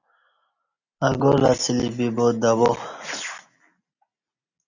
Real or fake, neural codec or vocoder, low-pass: real; none; 7.2 kHz